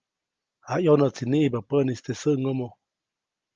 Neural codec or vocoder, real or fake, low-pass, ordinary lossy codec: none; real; 7.2 kHz; Opus, 24 kbps